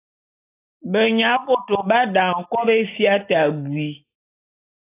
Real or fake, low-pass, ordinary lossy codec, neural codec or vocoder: real; 3.6 kHz; AAC, 24 kbps; none